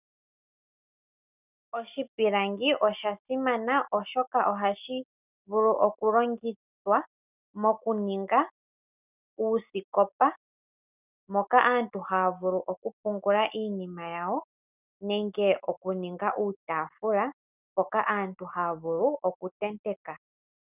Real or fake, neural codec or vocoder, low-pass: real; none; 3.6 kHz